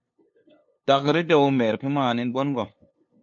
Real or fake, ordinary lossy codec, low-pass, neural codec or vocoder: fake; MP3, 48 kbps; 7.2 kHz; codec, 16 kHz, 2 kbps, FunCodec, trained on LibriTTS, 25 frames a second